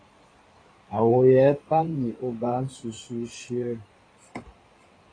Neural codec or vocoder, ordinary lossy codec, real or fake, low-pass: codec, 16 kHz in and 24 kHz out, 2.2 kbps, FireRedTTS-2 codec; AAC, 32 kbps; fake; 9.9 kHz